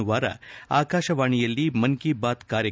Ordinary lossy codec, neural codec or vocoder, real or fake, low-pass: none; none; real; none